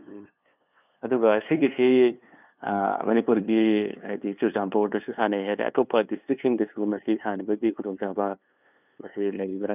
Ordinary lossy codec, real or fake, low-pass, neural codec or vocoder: none; fake; 3.6 kHz; codec, 16 kHz, 2 kbps, FunCodec, trained on LibriTTS, 25 frames a second